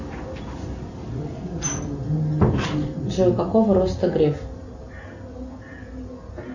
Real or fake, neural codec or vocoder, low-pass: real; none; 7.2 kHz